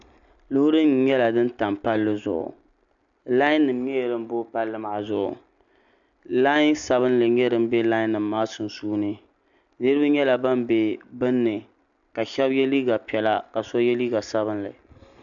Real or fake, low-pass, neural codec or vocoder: real; 7.2 kHz; none